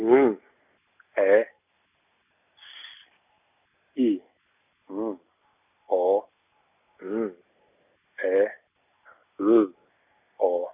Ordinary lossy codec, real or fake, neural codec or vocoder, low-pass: none; real; none; 3.6 kHz